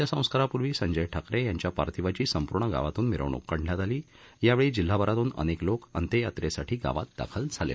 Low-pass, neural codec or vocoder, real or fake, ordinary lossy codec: none; none; real; none